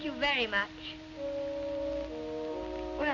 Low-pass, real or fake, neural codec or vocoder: 7.2 kHz; real; none